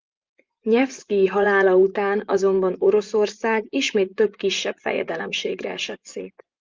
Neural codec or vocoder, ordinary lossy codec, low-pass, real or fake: vocoder, 24 kHz, 100 mel bands, Vocos; Opus, 24 kbps; 7.2 kHz; fake